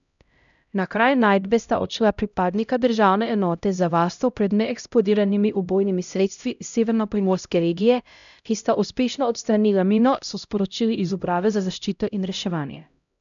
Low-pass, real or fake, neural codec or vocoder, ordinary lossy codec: 7.2 kHz; fake; codec, 16 kHz, 0.5 kbps, X-Codec, HuBERT features, trained on LibriSpeech; none